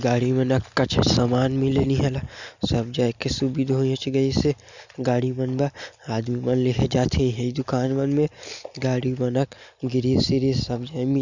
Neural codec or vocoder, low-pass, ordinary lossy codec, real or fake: none; 7.2 kHz; none; real